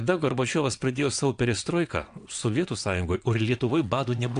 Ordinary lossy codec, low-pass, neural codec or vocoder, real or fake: AAC, 64 kbps; 9.9 kHz; vocoder, 22.05 kHz, 80 mel bands, WaveNeXt; fake